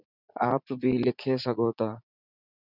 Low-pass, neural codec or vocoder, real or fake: 5.4 kHz; vocoder, 44.1 kHz, 80 mel bands, Vocos; fake